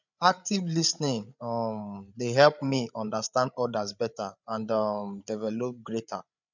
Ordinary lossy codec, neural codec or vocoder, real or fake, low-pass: none; codec, 16 kHz, 8 kbps, FreqCodec, larger model; fake; 7.2 kHz